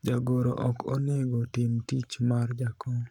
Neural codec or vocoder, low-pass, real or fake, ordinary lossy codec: codec, 44.1 kHz, 7.8 kbps, Pupu-Codec; 19.8 kHz; fake; none